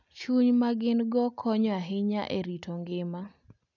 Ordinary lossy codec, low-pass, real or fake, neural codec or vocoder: none; 7.2 kHz; real; none